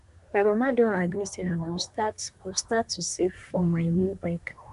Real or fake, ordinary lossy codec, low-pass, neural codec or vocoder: fake; none; 10.8 kHz; codec, 24 kHz, 1 kbps, SNAC